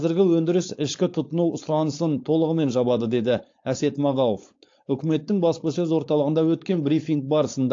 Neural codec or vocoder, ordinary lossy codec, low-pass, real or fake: codec, 16 kHz, 4.8 kbps, FACodec; AAC, 48 kbps; 7.2 kHz; fake